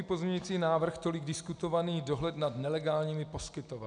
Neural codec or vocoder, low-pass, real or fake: none; 9.9 kHz; real